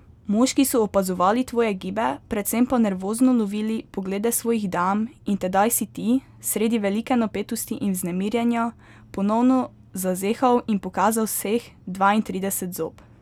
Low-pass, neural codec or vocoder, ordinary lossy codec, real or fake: 19.8 kHz; none; none; real